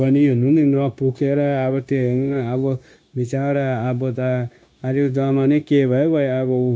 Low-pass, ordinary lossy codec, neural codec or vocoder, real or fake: none; none; codec, 16 kHz, 0.9 kbps, LongCat-Audio-Codec; fake